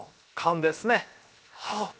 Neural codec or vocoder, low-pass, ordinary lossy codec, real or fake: codec, 16 kHz, 0.7 kbps, FocalCodec; none; none; fake